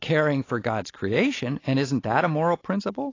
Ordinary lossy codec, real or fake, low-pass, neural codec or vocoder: AAC, 32 kbps; real; 7.2 kHz; none